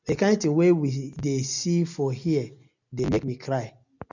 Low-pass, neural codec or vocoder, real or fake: 7.2 kHz; none; real